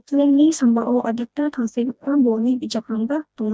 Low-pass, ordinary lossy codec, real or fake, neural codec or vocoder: none; none; fake; codec, 16 kHz, 1 kbps, FreqCodec, smaller model